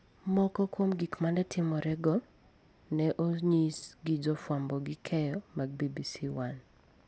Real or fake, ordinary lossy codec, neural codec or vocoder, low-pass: real; none; none; none